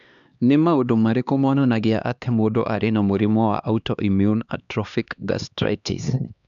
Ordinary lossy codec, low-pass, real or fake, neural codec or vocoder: none; 7.2 kHz; fake; codec, 16 kHz, 2 kbps, X-Codec, HuBERT features, trained on LibriSpeech